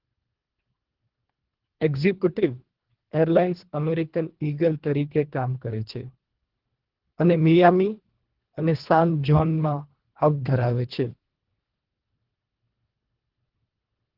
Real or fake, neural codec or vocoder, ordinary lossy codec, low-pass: fake; codec, 24 kHz, 1.5 kbps, HILCodec; Opus, 16 kbps; 5.4 kHz